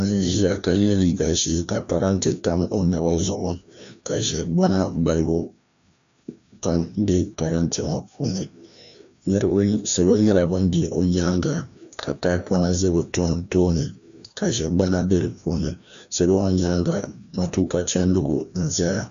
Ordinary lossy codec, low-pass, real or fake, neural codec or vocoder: MP3, 64 kbps; 7.2 kHz; fake; codec, 16 kHz, 1 kbps, FreqCodec, larger model